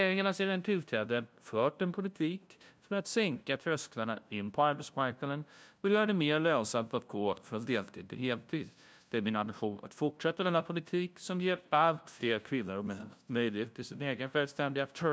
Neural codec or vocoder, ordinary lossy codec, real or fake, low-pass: codec, 16 kHz, 0.5 kbps, FunCodec, trained on LibriTTS, 25 frames a second; none; fake; none